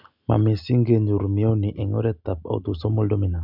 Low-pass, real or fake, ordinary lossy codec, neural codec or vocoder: 5.4 kHz; real; none; none